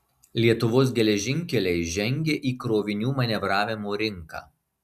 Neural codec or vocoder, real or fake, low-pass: none; real; 14.4 kHz